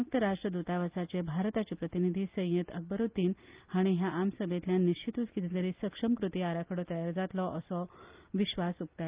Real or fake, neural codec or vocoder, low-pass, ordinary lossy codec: real; none; 3.6 kHz; Opus, 24 kbps